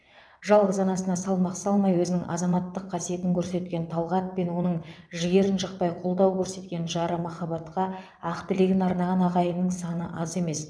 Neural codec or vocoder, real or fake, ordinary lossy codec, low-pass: vocoder, 22.05 kHz, 80 mel bands, WaveNeXt; fake; none; none